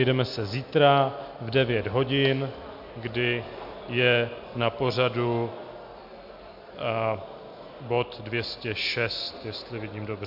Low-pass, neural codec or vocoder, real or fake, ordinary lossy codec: 5.4 kHz; none; real; MP3, 48 kbps